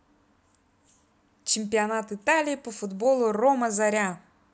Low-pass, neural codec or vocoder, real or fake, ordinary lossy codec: none; none; real; none